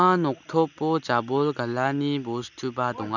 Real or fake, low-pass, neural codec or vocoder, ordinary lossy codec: real; 7.2 kHz; none; none